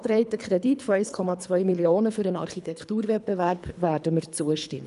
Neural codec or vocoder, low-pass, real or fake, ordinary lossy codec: codec, 24 kHz, 3 kbps, HILCodec; 10.8 kHz; fake; none